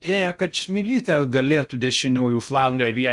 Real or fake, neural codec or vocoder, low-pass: fake; codec, 16 kHz in and 24 kHz out, 0.6 kbps, FocalCodec, streaming, 2048 codes; 10.8 kHz